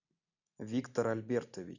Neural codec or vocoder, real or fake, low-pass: none; real; 7.2 kHz